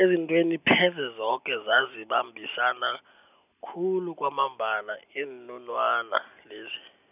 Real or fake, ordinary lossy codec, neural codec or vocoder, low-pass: real; none; none; 3.6 kHz